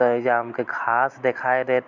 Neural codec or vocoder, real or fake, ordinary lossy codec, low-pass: none; real; MP3, 64 kbps; 7.2 kHz